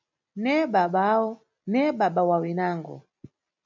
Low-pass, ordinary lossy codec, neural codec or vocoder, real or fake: 7.2 kHz; MP3, 64 kbps; none; real